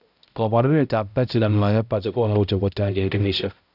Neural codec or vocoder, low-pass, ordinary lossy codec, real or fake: codec, 16 kHz, 0.5 kbps, X-Codec, HuBERT features, trained on balanced general audio; 5.4 kHz; none; fake